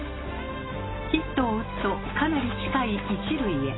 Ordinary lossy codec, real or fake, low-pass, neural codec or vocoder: AAC, 16 kbps; real; 7.2 kHz; none